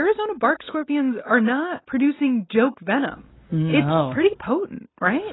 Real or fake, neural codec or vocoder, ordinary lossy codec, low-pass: real; none; AAC, 16 kbps; 7.2 kHz